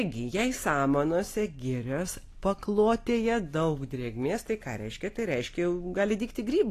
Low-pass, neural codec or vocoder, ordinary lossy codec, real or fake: 14.4 kHz; none; AAC, 48 kbps; real